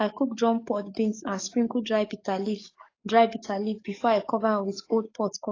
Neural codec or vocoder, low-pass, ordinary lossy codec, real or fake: codec, 44.1 kHz, 7.8 kbps, DAC; 7.2 kHz; AAC, 32 kbps; fake